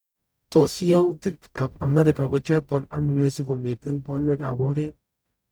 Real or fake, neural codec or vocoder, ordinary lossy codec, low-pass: fake; codec, 44.1 kHz, 0.9 kbps, DAC; none; none